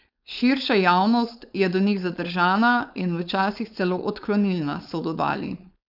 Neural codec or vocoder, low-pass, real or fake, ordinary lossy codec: codec, 16 kHz, 4.8 kbps, FACodec; 5.4 kHz; fake; none